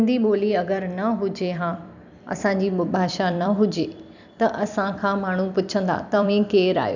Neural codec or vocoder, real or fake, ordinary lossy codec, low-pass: vocoder, 44.1 kHz, 128 mel bands every 256 samples, BigVGAN v2; fake; none; 7.2 kHz